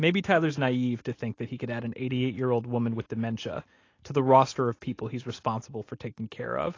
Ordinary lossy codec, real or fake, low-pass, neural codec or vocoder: AAC, 32 kbps; real; 7.2 kHz; none